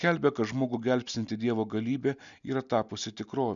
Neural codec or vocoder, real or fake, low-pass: none; real; 7.2 kHz